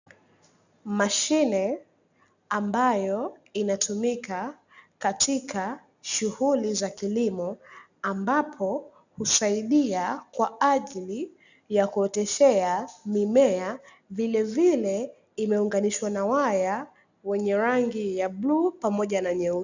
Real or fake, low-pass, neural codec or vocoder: real; 7.2 kHz; none